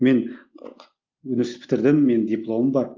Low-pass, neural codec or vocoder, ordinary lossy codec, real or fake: 7.2 kHz; none; Opus, 32 kbps; real